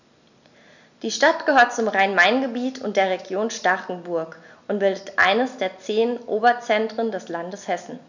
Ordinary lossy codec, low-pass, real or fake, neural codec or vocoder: none; 7.2 kHz; real; none